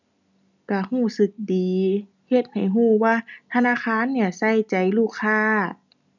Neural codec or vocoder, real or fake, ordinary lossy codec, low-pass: none; real; none; 7.2 kHz